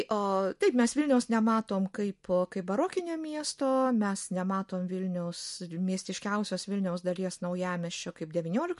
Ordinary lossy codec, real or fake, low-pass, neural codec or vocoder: MP3, 48 kbps; real; 14.4 kHz; none